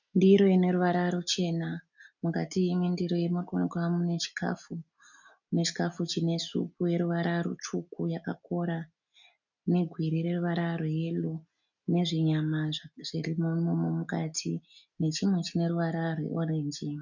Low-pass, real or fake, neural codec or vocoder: 7.2 kHz; real; none